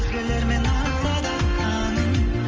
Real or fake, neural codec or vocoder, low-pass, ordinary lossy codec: real; none; 7.2 kHz; Opus, 24 kbps